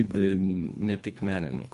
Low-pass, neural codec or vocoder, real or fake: 10.8 kHz; codec, 24 kHz, 1.5 kbps, HILCodec; fake